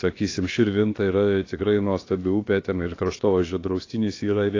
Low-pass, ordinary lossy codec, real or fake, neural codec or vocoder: 7.2 kHz; AAC, 32 kbps; fake; codec, 16 kHz, about 1 kbps, DyCAST, with the encoder's durations